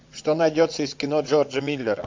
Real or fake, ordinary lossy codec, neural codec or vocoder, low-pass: fake; MP3, 48 kbps; vocoder, 22.05 kHz, 80 mel bands, WaveNeXt; 7.2 kHz